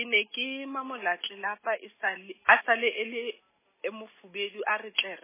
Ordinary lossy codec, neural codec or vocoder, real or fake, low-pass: MP3, 16 kbps; none; real; 3.6 kHz